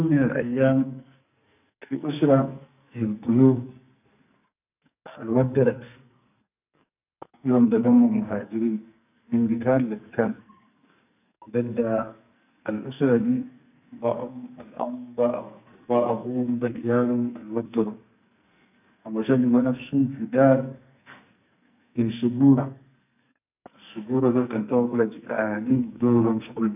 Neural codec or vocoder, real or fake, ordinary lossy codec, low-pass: codec, 44.1 kHz, 2.6 kbps, SNAC; fake; none; 3.6 kHz